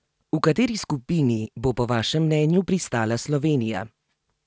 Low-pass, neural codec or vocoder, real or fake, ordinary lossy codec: none; none; real; none